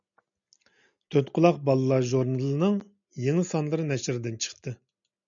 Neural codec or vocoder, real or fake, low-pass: none; real; 7.2 kHz